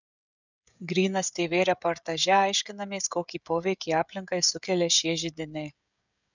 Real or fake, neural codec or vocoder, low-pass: fake; codec, 16 kHz, 16 kbps, FreqCodec, smaller model; 7.2 kHz